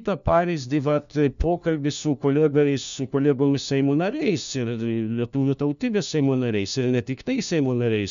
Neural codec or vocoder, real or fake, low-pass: codec, 16 kHz, 1 kbps, FunCodec, trained on LibriTTS, 50 frames a second; fake; 7.2 kHz